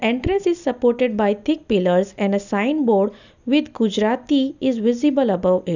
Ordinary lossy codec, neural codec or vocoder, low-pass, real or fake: none; none; 7.2 kHz; real